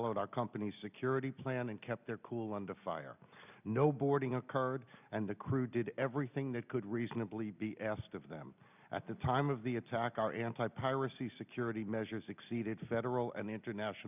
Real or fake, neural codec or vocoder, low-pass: real; none; 3.6 kHz